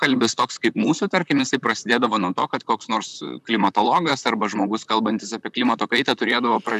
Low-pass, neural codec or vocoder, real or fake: 14.4 kHz; vocoder, 44.1 kHz, 128 mel bands, Pupu-Vocoder; fake